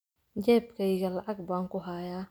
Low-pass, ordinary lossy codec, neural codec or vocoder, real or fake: none; none; none; real